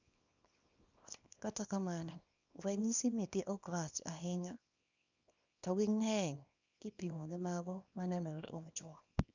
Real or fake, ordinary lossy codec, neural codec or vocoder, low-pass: fake; none; codec, 24 kHz, 0.9 kbps, WavTokenizer, small release; 7.2 kHz